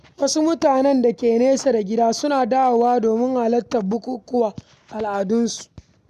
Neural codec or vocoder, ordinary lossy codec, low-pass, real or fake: none; none; 14.4 kHz; real